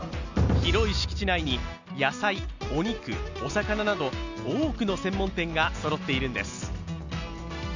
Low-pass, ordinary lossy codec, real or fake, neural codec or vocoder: 7.2 kHz; none; real; none